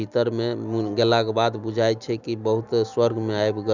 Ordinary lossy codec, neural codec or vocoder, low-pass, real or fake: none; none; 7.2 kHz; real